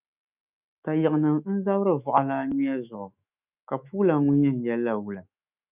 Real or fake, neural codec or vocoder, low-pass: fake; autoencoder, 48 kHz, 128 numbers a frame, DAC-VAE, trained on Japanese speech; 3.6 kHz